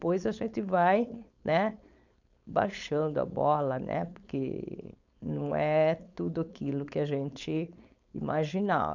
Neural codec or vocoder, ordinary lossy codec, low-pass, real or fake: codec, 16 kHz, 4.8 kbps, FACodec; none; 7.2 kHz; fake